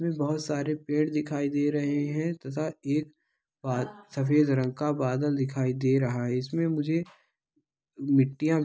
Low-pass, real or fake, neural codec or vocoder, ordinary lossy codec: none; real; none; none